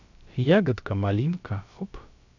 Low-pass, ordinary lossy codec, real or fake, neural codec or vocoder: 7.2 kHz; none; fake; codec, 16 kHz, about 1 kbps, DyCAST, with the encoder's durations